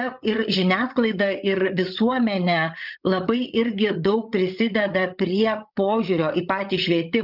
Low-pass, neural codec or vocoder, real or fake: 5.4 kHz; codec, 16 kHz, 8 kbps, FreqCodec, larger model; fake